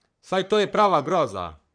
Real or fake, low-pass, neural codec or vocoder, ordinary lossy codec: fake; 9.9 kHz; codec, 44.1 kHz, 3.4 kbps, Pupu-Codec; none